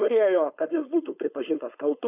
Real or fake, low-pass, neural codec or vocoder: fake; 3.6 kHz; codec, 16 kHz, 4.8 kbps, FACodec